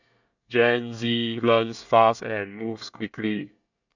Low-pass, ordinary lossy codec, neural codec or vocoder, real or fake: 7.2 kHz; none; codec, 24 kHz, 1 kbps, SNAC; fake